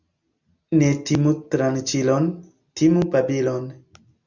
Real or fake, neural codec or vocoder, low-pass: real; none; 7.2 kHz